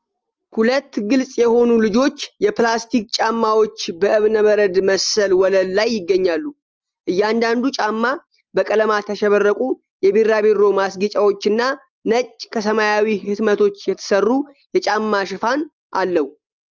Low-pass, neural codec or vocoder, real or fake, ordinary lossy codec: 7.2 kHz; none; real; Opus, 24 kbps